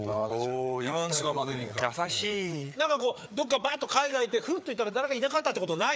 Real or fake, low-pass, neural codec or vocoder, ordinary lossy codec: fake; none; codec, 16 kHz, 4 kbps, FreqCodec, larger model; none